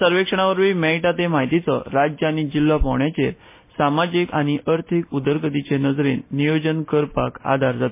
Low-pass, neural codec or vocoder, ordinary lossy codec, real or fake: 3.6 kHz; none; MP3, 24 kbps; real